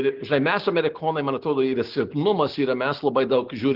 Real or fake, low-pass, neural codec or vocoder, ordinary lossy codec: real; 5.4 kHz; none; Opus, 16 kbps